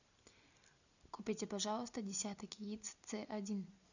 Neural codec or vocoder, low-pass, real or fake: none; 7.2 kHz; real